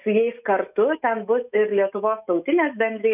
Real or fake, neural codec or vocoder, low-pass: fake; autoencoder, 48 kHz, 128 numbers a frame, DAC-VAE, trained on Japanese speech; 3.6 kHz